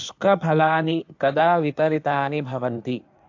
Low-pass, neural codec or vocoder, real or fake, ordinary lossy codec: 7.2 kHz; codec, 16 kHz in and 24 kHz out, 1.1 kbps, FireRedTTS-2 codec; fake; AAC, 48 kbps